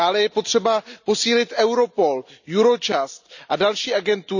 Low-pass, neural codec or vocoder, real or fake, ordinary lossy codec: 7.2 kHz; none; real; none